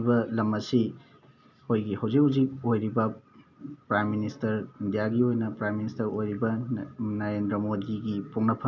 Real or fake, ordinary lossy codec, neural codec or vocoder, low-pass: real; none; none; 7.2 kHz